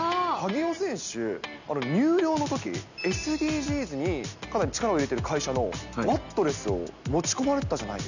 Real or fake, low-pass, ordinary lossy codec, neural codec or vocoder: real; 7.2 kHz; none; none